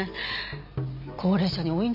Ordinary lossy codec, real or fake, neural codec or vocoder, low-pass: none; real; none; 5.4 kHz